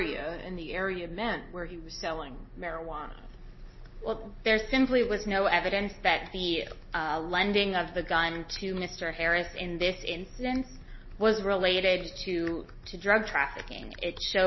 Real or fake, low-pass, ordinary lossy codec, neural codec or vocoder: real; 7.2 kHz; MP3, 24 kbps; none